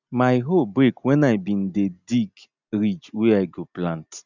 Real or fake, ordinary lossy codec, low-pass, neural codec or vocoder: real; none; 7.2 kHz; none